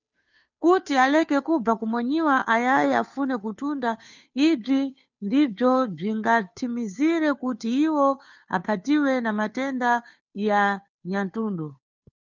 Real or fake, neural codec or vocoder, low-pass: fake; codec, 16 kHz, 2 kbps, FunCodec, trained on Chinese and English, 25 frames a second; 7.2 kHz